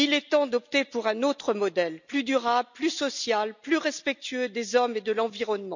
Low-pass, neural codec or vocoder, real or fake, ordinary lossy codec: 7.2 kHz; none; real; none